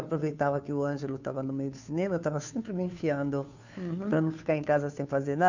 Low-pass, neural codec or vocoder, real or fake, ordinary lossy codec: 7.2 kHz; codec, 16 kHz, 2 kbps, FunCodec, trained on Chinese and English, 25 frames a second; fake; none